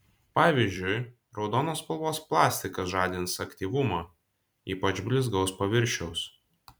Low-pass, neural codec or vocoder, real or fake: 19.8 kHz; vocoder, 44.1 kHz, 128 mel bands every 256 samples, BigVGAN v2; fake